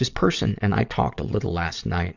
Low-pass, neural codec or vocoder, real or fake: 7.2 kHz; vocoder, 22.05 kHz, 80 mel bands, WaveNeXt; fake